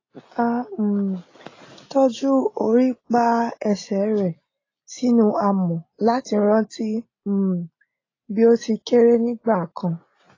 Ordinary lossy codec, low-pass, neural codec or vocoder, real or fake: AAC, 32 kbps; 7.2 kHz; none; real